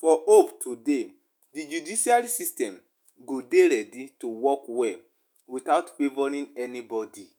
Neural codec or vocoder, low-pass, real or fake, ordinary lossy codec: autoencoder, 48 kHz, 128 numbers a frame, DAC-VAE, trained on Japanese speech; none; fake; none